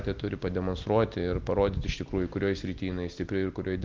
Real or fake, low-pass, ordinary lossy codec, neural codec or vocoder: real; 7.2 kHz; Opus, 32 kbps; none